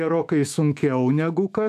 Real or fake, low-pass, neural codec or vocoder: fake; 14.4 kHz; autoencoder, 48 kHz, 128 numbers a frame, DAC-VAE, trained on Japanese speech